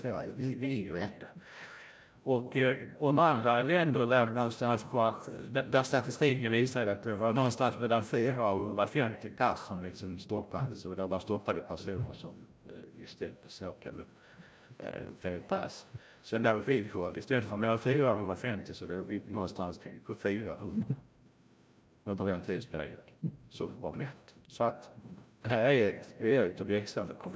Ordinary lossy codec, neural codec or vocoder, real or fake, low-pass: none; codec, 16 kHz, 0.5 kbps, FreqCodec, larger model; fake; none